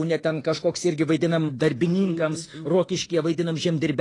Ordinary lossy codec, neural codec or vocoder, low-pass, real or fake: AAC, 32 kbps; autoencoder, 48 kHz, 32 numbers a frame, DAC-VAE, trained on Japanese speech; 10.8 kHz; fake